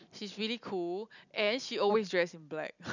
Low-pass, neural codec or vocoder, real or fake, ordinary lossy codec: 7.2 kHz; none; real; none